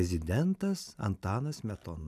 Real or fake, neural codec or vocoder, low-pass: real; none; 14.4 kHz